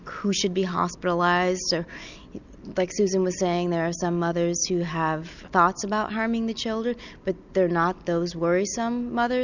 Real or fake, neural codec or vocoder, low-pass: real; none; 7.2 kHz